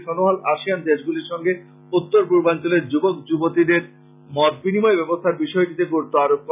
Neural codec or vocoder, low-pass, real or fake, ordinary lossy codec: none; 3.6 kHz; real; none